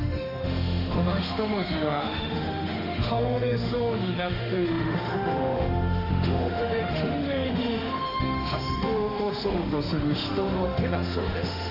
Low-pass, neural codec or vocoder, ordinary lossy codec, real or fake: 5.4 kHz; autoencoder, 48 kHz, 32 numbers a frame, DAC-VAE, trained on Japanese speech; none; fake